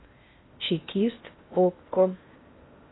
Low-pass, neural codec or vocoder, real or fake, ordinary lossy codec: 7.2 kHz; codec, 16 kHz in and 24 kHz out, 0.6 kbps, FocalCodec, streaming, 4096 codes; fake; AAC, 16 kbps